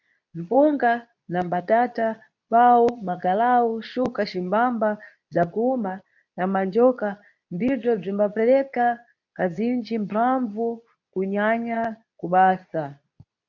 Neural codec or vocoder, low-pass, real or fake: codec, 24 kHz, 0.9 kbps, WavTokenizer, medium speech release version 2; 7.2 kHz; fake